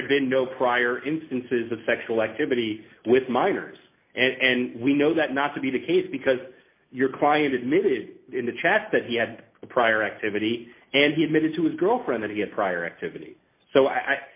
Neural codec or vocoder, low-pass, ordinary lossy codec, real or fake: none; 3.6 kHz; MP3, 24 kbps; real